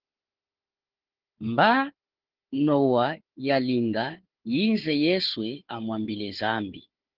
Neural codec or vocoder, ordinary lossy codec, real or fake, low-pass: codec, 16 kHz, 4 kbps, FunCodec, trained on Chinese and English, 50 frames a second; Opus, 16 kbps; fake; 5.4 kHz